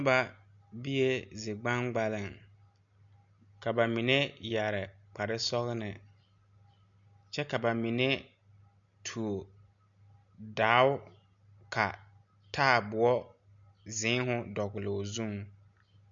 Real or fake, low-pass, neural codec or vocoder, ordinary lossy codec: real; 7.2 kHz; none; MP3, 64 kbps